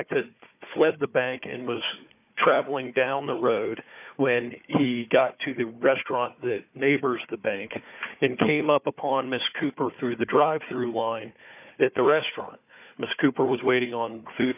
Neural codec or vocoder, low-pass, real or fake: codec, 16 kHz, 4 kbps, FunCodec, trained on Chinese and English, 50 frames a second; 3.6 kHz; fake